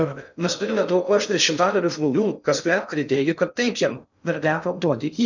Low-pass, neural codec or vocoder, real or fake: 7.2 kHz; codec, 16 kHz in and 24 kHz out, 0.6 kbps, FocalCodec, streaming, 2048 codes; fake